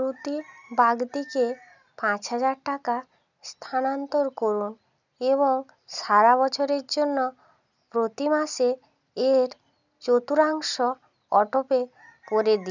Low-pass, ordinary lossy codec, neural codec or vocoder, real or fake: 7.2 kHz; none; none; real